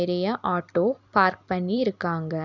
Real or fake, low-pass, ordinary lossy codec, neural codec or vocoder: fake; 7.2 kHz; none; vocoder, 44.1 kHz, 128 mel bands every 256 samples, BigVGAN v2